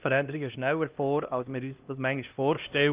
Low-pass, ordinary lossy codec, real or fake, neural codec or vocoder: 3.6 kHz; Opus, 24 kbps; fake; codec, 16 kHz, 1 kbps, X-Codec, HuBERT features, trained on LibriSpeech